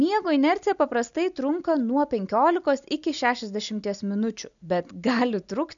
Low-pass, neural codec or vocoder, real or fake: 7.2 kHz; none; real